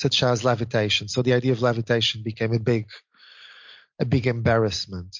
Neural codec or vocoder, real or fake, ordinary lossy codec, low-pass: none; real; MP3, 48 kbps; 7.2 kHz